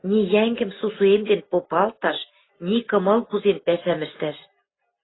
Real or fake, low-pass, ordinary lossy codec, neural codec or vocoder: real; 7.2 kHz; AAC, 16 kbps; none